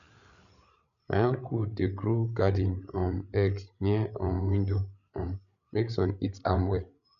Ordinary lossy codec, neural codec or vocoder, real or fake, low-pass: none; codec, 16 kHz, 8 kbps, FreqCodec, larger model; fake; 7.2 kHz